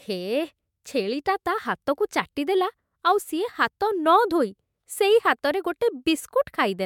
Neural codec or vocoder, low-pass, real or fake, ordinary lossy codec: autoencoder, 48 kHz, 128 numbers a frame, DAC-VAE, trained on Japanese speech; 19.8 kHz; fake; MP3, 96 kbps